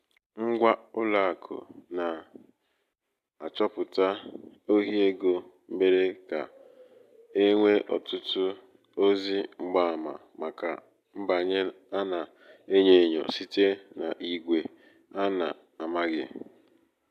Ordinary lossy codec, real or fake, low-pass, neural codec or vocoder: AAC, 96 kbps; real; 14.4 kHz; none